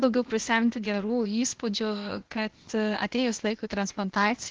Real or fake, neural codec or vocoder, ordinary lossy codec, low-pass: fake; codec, 16 kHz, 0.8 kbps, ZipCodec; Opus, 16 kbps; 7.2 kHz